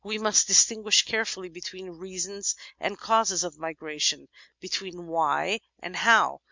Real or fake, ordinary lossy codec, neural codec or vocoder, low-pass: real; MP3, 64 kbps; none; 7.2 kHz